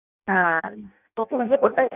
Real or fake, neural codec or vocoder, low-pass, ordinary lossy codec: fake; codec, 16 kHz in and 24 kHz out, 0.6 kbps, FireRedTTS-2 codec; 3.6 kHz; none